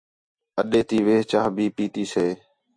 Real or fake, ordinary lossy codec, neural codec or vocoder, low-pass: real; MP3, 64 kbps; none; 9.9 kHz